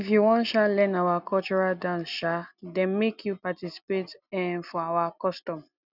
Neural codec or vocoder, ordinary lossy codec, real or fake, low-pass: none; none; real; 5.4 kHz